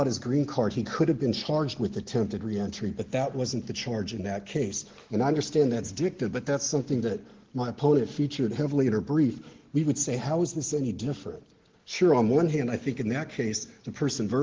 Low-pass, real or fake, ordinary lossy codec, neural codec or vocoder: 7.2 kHz; real; Opus, 16 kbps; none